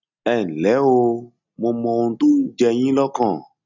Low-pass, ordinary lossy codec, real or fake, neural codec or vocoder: 7.2 kHz; none; real; none